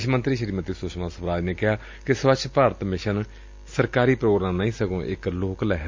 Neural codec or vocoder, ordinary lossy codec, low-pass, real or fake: none; MP3, 64 kbps; 7.2 kHz; real